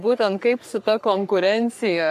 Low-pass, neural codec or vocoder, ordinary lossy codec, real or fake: 14.4 kHz; codec, 44.1 kHz, 3.4 kbps, Pupu-Codec; AAC, 96 kbps; fake